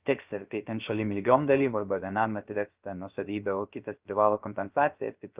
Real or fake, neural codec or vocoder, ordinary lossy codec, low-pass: fake; codec, 16 kHz, 0.3 kbps, FocalCodec; Opus, 24 kbps; 3.6 kHz